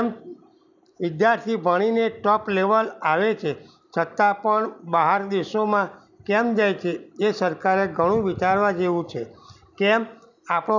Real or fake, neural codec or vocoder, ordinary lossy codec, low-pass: real; none; none; 7.2 kHz